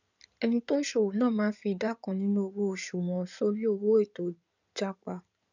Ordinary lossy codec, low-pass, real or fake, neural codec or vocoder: none; 7.2 kHz; fake; codec, 16 kHz in and 24 kHz out, 2.2 kbps, FireRedTTS-2 codec